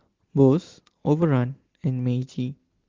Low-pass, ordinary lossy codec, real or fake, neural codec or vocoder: 7.2 kHz; Opus, 16 kbps; real; none